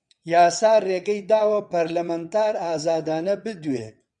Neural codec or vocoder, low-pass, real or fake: vocoder, 22.05 kHz, 80 mel bands, WaveNeXt; 9.9 kHz; fake